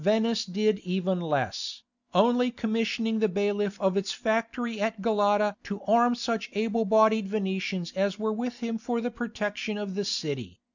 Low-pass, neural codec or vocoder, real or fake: 7.2 kHz; none; real